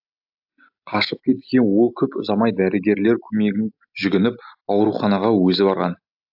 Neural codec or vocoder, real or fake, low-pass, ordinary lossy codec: none; real; 5.4 kHz; AAC, 48 kbps